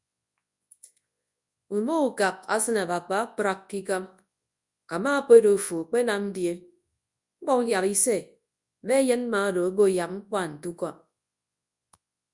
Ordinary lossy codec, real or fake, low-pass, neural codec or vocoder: Opus, 64 kbps; fake; 10.8 kHz; codec, 24 kHz, 0.9 kbps, WavTokenizer, large speech release